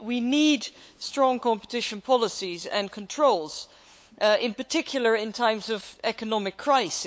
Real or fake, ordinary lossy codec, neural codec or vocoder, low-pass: fake; none; codec, 16 kHz, 8 kbps, FunCodec, trained on LibriTTS, 25 frames a second; none